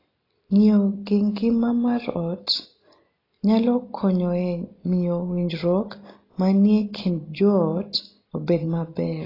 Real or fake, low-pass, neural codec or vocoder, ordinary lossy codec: real; 5.4 kHz; none; AAC, 24 kbps